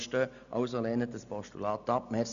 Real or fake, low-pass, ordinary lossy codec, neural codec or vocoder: real; 7.2 kHz; AAC, 96 kbps; none